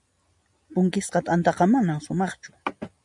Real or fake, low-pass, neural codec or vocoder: real; 10.8 kHz; none